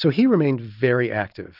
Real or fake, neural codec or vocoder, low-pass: real; none; 5.4 kHz